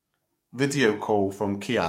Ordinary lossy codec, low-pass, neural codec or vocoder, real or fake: MP3, 64 kbps; 19.8 kHz; codec, 44.1 kHz, 7.8 kbps, DAC; fake